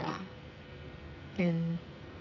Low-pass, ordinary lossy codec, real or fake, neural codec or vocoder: 7.2 kHz; none; fake; codec, 44.1 kHz, 2.6 kbps, SNAC